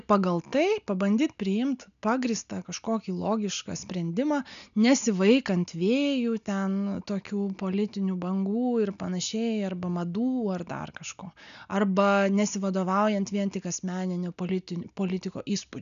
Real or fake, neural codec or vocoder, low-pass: real; none; 7.2 kHz